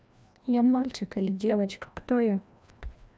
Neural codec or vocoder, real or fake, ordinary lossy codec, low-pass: codec, 16 kHz, 1 kbps, FreqCodec, larger model; fake; none; none